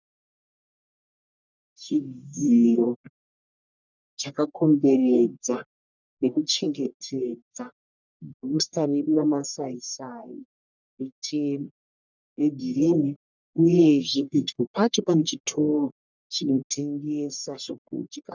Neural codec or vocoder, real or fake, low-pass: codec, 44.1 kHz, 1.7 kbps, Pupu-Codec; fake; 7.2 kHz